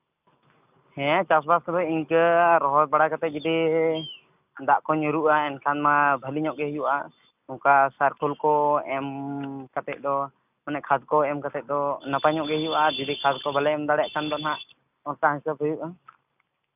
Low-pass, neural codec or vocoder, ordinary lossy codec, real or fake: 3.6 kHz; none; none; real